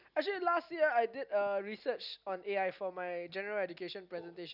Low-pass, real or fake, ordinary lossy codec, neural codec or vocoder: 5.4 kHz; real; none; none